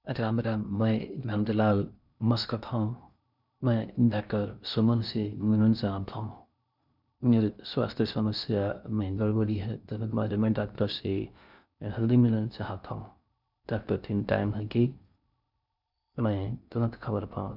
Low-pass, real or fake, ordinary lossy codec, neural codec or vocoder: 5.4 kHz; fake; none; codec, 16 kHz in and 24 kHz out, 0.6 kbps, FocalCodec, streaming, 2048 codes